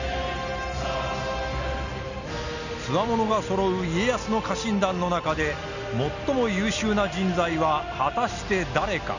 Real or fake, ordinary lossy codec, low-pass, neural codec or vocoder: real; none; 7.2 kHz; none